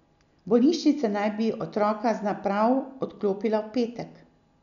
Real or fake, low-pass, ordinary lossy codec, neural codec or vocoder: real; 7.2 kHz; none; none